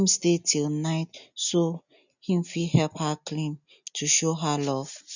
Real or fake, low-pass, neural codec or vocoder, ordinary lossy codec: real; 7.2 kHz; none; none